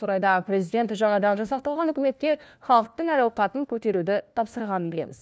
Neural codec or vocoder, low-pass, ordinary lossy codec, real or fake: codec, 16 kHz, 1 kbps, FunCodec, trained on LibriTTS, 50 frames a second; none; none; fake